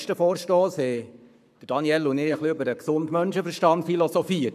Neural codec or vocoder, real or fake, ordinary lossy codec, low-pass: codec, 44.1 kHz, 7.8 kbps, Pupu-Codec; fake; none; 14.4 kHz